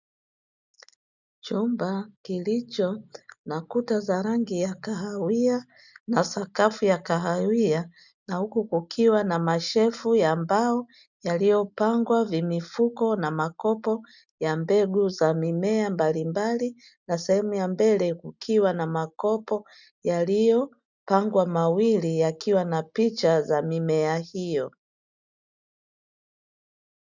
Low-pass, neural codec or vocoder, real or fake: 7.2 kHz; none; real